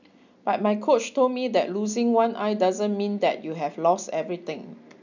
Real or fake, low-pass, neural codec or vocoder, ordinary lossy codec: real; 7.2 kHz; none; none